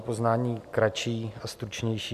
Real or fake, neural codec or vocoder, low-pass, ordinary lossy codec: real; none; 14.4 kHz; MP3, 96 kbps